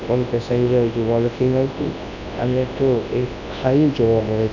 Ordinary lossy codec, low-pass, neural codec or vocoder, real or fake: none; 7.2 kHz; codec, 24 kHz, 0.9 kbps, WavTokenizer, large speech release; fake